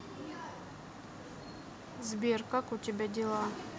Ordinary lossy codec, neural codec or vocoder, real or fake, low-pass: none; none; real; none